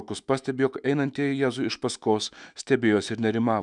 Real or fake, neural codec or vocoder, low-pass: fake; autoencoder, 48 kHz, 128 numbers a frame, DAC-VAE, trained on Japanese speech; 10.8 kHz